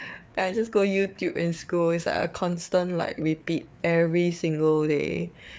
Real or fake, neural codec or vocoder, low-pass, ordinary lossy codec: fake; codec, 16 kHz, 4 kbps, FreqCodec, larger model; none; none